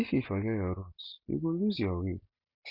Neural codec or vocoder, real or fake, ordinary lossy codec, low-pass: none; real; none; 5.4 kHz